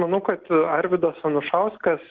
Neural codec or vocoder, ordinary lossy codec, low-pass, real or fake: none; Opus, 16 kbps; 7.2 kHz; real